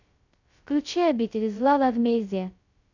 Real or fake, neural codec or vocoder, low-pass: fake; codec, 16 kHz, 0.2 kbps, FocalCodec; 7.2 kHz